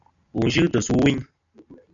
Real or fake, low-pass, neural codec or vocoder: real; 7.2 kHz; none